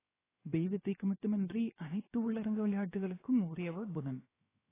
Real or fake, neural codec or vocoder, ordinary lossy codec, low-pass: fake; codec, 16 kHz, 0.7 kbps, FocalCodec; AAC, 16 kbps; 3.6 kHz